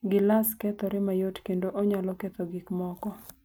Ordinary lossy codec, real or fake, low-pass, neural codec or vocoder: none; real; none; none